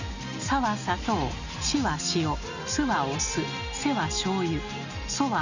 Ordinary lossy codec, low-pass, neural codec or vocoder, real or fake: none; 7.2 kHz; none; real